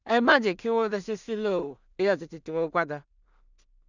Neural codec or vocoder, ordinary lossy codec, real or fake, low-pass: codec, 16 kHz in and 24 kHz out, 0.4 kbps, LongCat-Audio-Codec, two codebook decoder; none; fake; 7.2 kHz